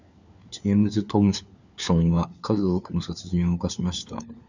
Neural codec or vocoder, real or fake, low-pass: codec, 16 kHz, 4 kbps, FunCodec, trained on LibriTTS, 50 frames a second; fake; 7.2 kHz